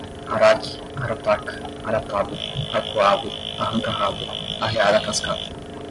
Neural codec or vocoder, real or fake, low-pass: none; real; 10.8 kHz